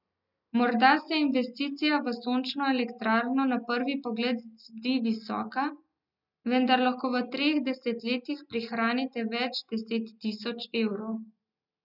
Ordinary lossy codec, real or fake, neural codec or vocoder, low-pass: none; real; none; 5.4 kHz